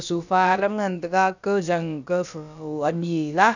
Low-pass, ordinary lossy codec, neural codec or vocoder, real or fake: 7.2 kHz; none; codec, 16 kHz, about 1 kbps, DyCAST, with the encoder's durations; fake